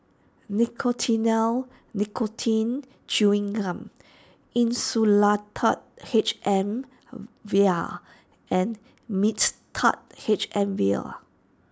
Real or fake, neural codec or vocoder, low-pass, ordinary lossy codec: real; none; none; none